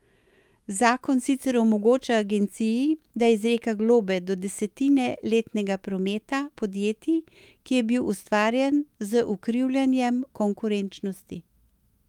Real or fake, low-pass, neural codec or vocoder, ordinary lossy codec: fake; 19.8 kHz; autoencoder, 48 kHz, 128 numbers a frame, DAC-VAE, trained on Japanese speech; Opus, 32 kbps